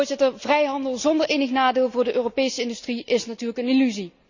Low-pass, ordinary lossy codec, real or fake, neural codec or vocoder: 7.2 kHz; none; real; none